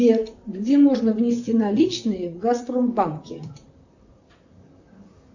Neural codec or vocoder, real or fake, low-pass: vocoder, 44.1 kHz, 128 mel bands, Pupu-Vocoder; fake; 7.2 kHz